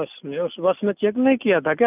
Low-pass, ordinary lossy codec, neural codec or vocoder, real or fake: 3.6 kHz; none; none; real